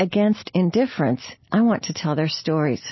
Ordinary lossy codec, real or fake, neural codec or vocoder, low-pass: MP3, 24 kbps; real; none; 7.2 kHz